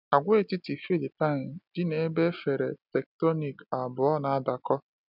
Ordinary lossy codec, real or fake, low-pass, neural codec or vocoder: none; real; 5.4 kHz; none